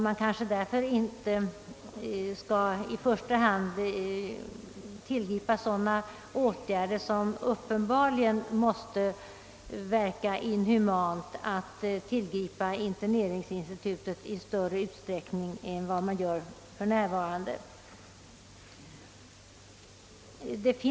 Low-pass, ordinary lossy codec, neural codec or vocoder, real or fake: none; none; none; real